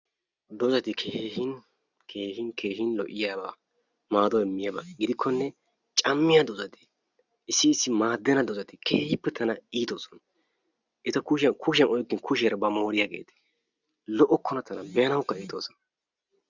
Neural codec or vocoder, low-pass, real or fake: none; 7.2 kHz; real